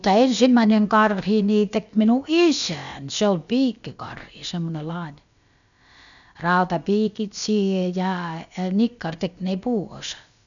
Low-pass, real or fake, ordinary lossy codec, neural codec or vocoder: 7.2 kHz; fake; none; codec, 16 kHz, about 1 kbps, DyCAST, with the encoder's durations